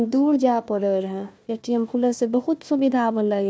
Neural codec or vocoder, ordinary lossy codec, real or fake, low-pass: codec, 16 kHz, 1 kbps, FunCodec, trained on Chinese and English, 50 frames a second; none; fake; none